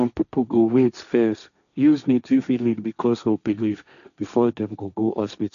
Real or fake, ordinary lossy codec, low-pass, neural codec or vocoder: fake; none; 7.2 kHz; codec, 16 kHz, 1.1 kbps, Voila-Tokenizer